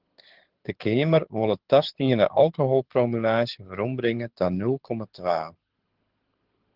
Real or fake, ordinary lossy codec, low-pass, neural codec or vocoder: fake; Opus, 16 kbps; 5.4 kHz; codec, 24 kHz, 6 kbps, HILCodec